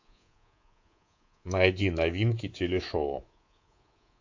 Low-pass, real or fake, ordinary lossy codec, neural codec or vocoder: 7.2 kHz; fake; AAC, 48 kbps; codec, 24 kHz, 3.1 kbps, DualCodec